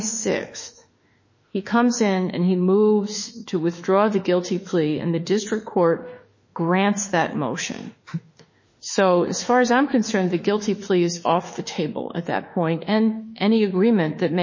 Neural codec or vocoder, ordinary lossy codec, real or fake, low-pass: autoencoder, 48 kHz, 32 numbers a frame, DAC-VAE, trained on Japanese speech; MP3, 32 kbps; fake; 7.2 kHz